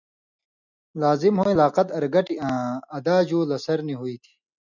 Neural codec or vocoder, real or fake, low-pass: none; real; 7.2 kHz